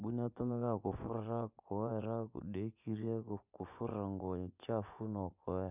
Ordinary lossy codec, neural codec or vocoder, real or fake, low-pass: none; vocoder, 24 kHz, 100 mel bands, Vocos; fake; 3.6 kHz